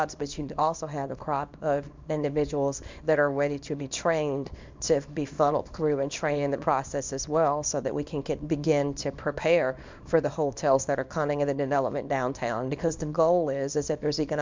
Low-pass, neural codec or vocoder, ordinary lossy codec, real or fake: 7.2 kHz; codec, 24 kHz, 0.9 kbps, WavTokenizer, small release; MP3, 64 kbps; fake